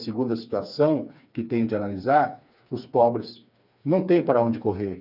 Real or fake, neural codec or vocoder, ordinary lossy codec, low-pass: fake; codec, 16 kHz, 4 kbps, FreqCodec, smaller model; none; 5.4 kHz